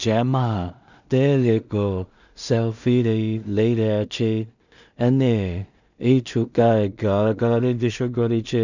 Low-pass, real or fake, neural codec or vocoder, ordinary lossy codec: 7.2 kHz; fake; codec, 16 kHz in and 24 kHz out, 0.4 kbps, LongCat-Audio-Codec, two codebook decoder; none